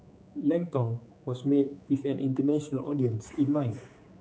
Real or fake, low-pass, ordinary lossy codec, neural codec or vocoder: fake; none; none; codec, 16 kHz, 4 kbps, X-Codec, HuBERT features, trained on general audio